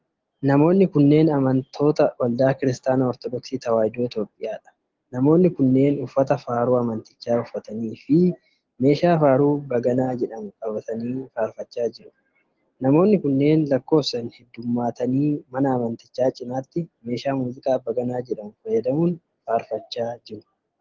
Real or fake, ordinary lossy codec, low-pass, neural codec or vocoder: real; Opus, 16 kbps; 7.2 kHz; none